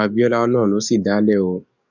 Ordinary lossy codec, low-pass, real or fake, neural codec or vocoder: none; 7.2 kHz; fake; codec, 44.1 kHz, 7.8 kbps, DAC